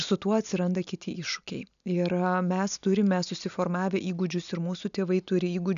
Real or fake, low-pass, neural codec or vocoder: real; 7.2 kHz; none